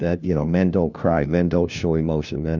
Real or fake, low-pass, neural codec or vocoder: fake; 7.2 kHz; codec, 16 kHz, 1 kbps, FunCodec, trained on LibriTTS, 50 frames a second